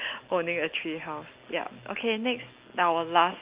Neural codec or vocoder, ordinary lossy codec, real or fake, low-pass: none; Opus, 24 kbps; real; 3.6 kHz